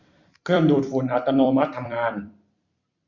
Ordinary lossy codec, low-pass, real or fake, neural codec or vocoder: none; 7.2 kHz; fake; vocoder, 44.1 kHz, 128 mel bands every 256 samples, BigVGAN v2